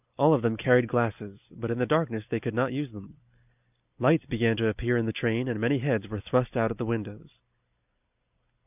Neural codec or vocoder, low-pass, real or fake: none; 3.6 kHz; real